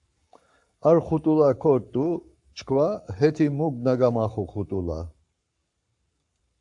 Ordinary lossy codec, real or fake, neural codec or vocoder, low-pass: AAC, 64 kbps; fake; codec, 44.1 kHz, 7.8 kbps, Pupu-Codec; 10.8 kHz